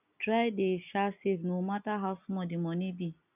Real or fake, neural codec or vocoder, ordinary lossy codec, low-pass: real; none; none; 3.6 kHz